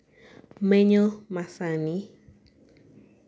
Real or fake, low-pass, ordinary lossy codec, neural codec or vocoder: real; none; none; none